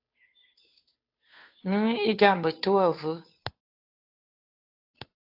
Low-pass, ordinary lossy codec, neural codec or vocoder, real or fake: 5.4 kHz; AAC, 48 kbps; codec, 16 kHz, 2 kbps, FunCodec, trained on Chinese and English, 25 frames a second; fake